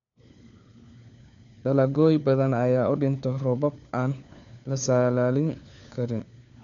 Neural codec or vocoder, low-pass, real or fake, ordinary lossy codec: codec, 16 kHz, 4 kbps, FunCodec, trained on LibriTTS, 50 frames a second; 7.2 kHz; fake; none